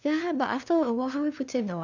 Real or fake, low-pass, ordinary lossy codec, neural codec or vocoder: fake; 7.2 kHz; none; codec, 16 kHz, 2 kbps, FunCodec, trained on LibriTTS, 25 frames a second